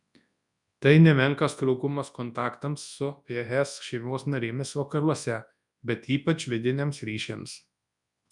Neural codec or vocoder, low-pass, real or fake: codec, 24 kHz, 0.9 kbps, WavTokenizer, large speech release; 10.8 kHz; fake